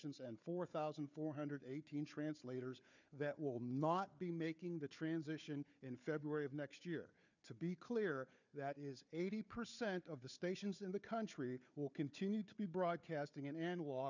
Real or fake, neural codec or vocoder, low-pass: fake; codec, 16 kHz, 4 kbps, FunCodec, trained on Chinese and English, 50 frames a second; 7.2 kHz